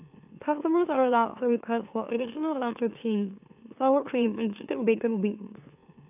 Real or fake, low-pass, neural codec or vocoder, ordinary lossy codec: fake; 3.6 kHz; autoencoder, 44.1 kHz, a latent of 192 numbers a frame, MeloTTS; AAC, 32 kbps